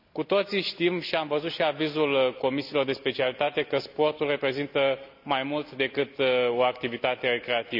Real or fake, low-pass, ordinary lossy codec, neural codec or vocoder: real; 5.4 kHz; none; none